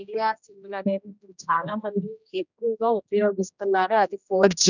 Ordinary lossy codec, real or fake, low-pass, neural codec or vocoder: none; fake; 7.2 kHz; codec, 16 kHz, 1 kbps, X-Codec, HuBERT features, trained on general audio